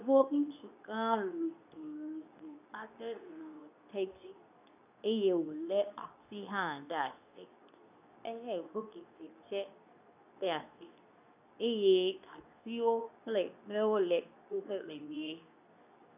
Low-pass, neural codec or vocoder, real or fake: 3.6 kHz; codec, 24 kHz, 0.9 kbps, WavTokenizer, medium speech release version 2; fake